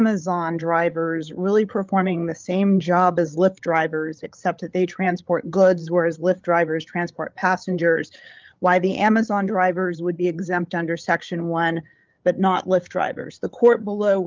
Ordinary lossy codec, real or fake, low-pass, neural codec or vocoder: Opus, 32 kbps; fake; 7.2 kHz; codec, 16 kHz, 8 kbps, FreqCodec, larger model